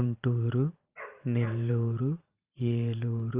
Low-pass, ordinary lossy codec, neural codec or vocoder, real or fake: 3.6 kHz; Opus, 24 kbps; vocoder, 44.1 kHz, 128 mel bands every 512 samples, BigVGAN v2; fake